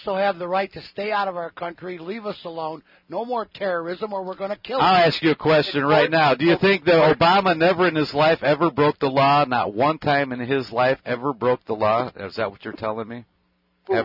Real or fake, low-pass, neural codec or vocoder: real; 5.4 kHz; none